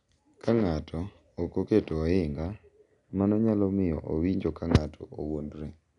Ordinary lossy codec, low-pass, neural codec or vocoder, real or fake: none; 10.8 kHz; none; real